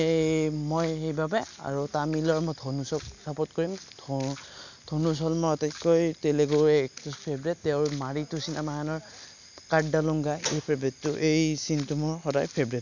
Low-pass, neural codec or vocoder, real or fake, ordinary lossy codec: 7.2 kHz; none; real; none